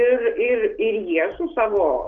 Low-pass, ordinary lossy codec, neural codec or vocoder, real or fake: 7.2 kHz; Opus, 32 kbps; none; real